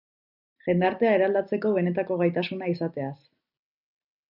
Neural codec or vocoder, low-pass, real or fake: none; 5.4 kHz; real